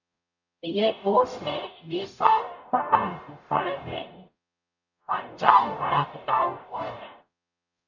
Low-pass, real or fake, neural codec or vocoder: 7.2 kHz; fake; codec, 44.1 kHz, 0.9 kbps, DAC